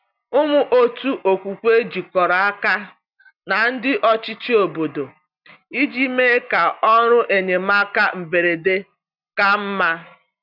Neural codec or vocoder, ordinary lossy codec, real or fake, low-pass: none; none; real; 5.4 kHz